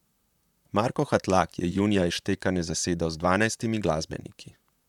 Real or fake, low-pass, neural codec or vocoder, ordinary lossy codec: fake; 19.8 kHz; vocoder, 44.1 kHz, 128 mel bands, Pupu-Vocoder; none